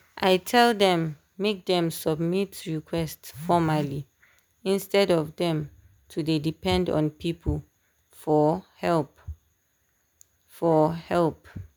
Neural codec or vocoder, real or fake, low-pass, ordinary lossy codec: none; real; none; none